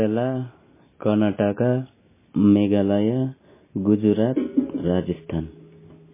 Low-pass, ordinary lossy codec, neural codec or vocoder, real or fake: 3.6 kHz; MP3, 16 kbps; none; real